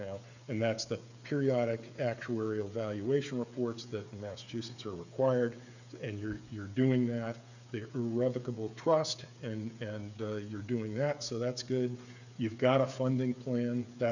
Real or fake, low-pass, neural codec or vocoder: fake; 7.2 kHz; codec, 16 kHz, 8 kbps, FreqCodec, smaller model